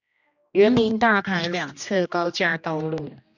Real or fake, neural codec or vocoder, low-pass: fake; codec, 16 kHz, 1 kbps, X-Codec, HuBERT features, trained on general audio; 7.2 kHz